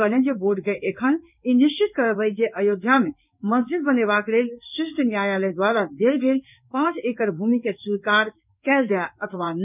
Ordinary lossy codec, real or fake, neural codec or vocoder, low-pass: none; fake; codec, 16 kHz in and 24 kHz out, 1 kbps, XY-Tokenizer; 3.6 kHz